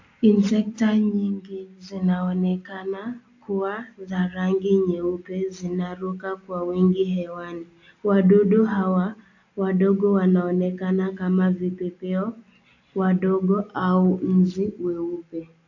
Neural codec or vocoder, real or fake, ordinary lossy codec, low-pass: none; real; AAC, 48 kbps; 7.2 kHz